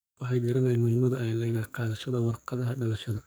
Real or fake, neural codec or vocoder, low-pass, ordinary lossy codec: fake; codec, 44.1 kHz, 2.6 kbps, SNAC; none; none